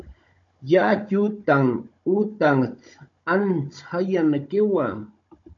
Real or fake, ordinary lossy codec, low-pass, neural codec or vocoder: fake; MP3, 48 kbps; 7.2 kHz; codec, 16 kHz, 16 kbps, FunCodec, trained on Chinese and English, 50 frames a second